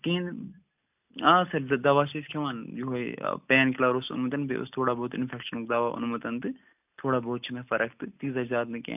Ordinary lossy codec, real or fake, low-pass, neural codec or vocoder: none; real; 3.6 kHz; none